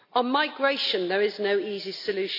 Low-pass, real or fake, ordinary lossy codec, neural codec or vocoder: 5.4 kHz; real; AAC, 32 kbps; none